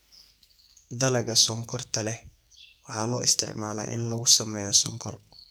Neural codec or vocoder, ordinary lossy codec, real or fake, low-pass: codec, 44.1 kHz, 2.6 kbps, SNAC; none; fake; none